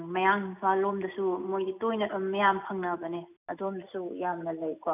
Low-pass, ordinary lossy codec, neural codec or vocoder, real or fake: 3.6 kHz; none; none; real